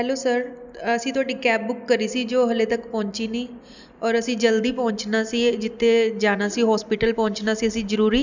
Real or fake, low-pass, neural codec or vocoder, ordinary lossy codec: real; 7.2 kHz; none; none